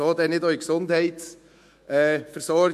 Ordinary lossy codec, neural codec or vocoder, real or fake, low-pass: MP3, 64 kbps; autoencoder, 48 kHz, 128 numbers a frame, DAC-VAE, trained on Japanese speech; fake; 14.4 kHz